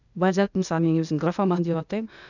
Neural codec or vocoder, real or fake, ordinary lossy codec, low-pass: codec, 16 kHz, 0.8 kbps, ZipCodec; fake; none; 7.2 kHz